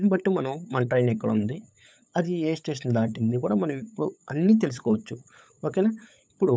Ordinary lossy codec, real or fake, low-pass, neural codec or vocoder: none; fake; none; codec, 16 kHz, 8 kbps, FunCodec, trained on LibriTTS, 25 frames a second